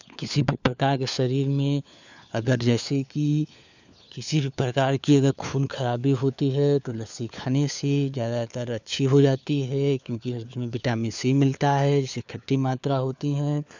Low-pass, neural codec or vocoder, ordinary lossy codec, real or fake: 7.2 kHz; codec, 16 kHz, 4 kbps, FunCodec, trained on LibriTTS, 50 frames a second; none; fake